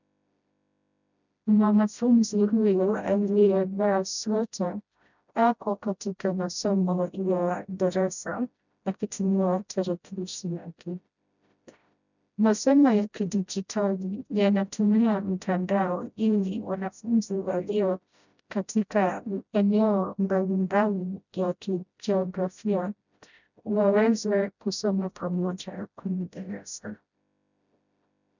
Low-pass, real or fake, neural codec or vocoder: 7.2 kHz; fake; codec, 16 kHz, 0.5 kbps, FreqCodec, smaller model